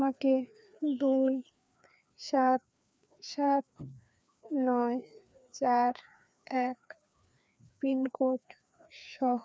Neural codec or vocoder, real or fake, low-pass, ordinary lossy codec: codec, 16 kHz, 2 kbps, FreqCodec, larger model; fake; none; none